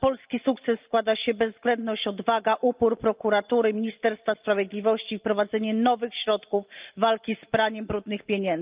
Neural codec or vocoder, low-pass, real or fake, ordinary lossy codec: none; 3.6 kHz; real; Opus, 24 kbps